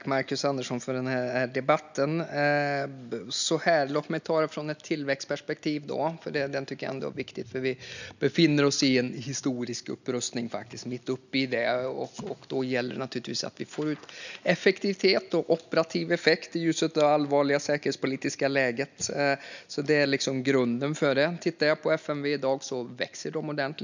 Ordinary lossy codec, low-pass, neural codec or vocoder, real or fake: none; 7.2 kHz; none; real